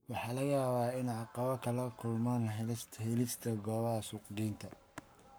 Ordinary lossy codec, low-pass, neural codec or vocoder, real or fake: none; none; codec, 44.1 kHz, 7.8 kbps, Pupu-Codec; fake